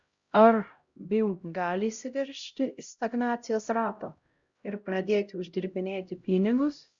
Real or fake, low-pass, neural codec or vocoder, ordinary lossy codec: fake; 7.2 kHz; codec, 16 kHz, 0.5 kbps, X-Codec, HuBERT features, trained on LibriSpeech; Opus, 64 kbps